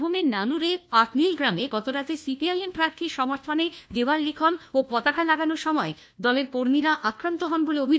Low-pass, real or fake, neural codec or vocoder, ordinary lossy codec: none; fake; codec, 16 kHz, 1 kbps, FunCodec, trained on LibriTTS, 50 frames a second; none